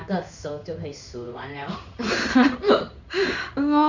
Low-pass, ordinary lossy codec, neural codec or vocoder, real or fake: 7.2 kHz; none; codec, 16 kHz in and 24 kHz out, 1 kbps, XY-Tokenizer; fake